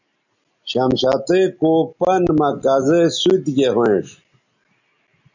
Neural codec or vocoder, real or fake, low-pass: none; real; 7.2 kHz